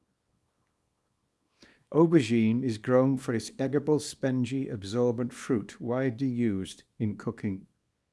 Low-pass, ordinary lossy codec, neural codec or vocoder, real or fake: none; none; codec, 24 kHz, 0.9 kbps, WavTokenizer, small release; fake